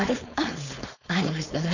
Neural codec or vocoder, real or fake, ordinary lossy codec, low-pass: codec, 16 kHz, 4.8 kbps, FACodec; fake; none; 7.2 kHz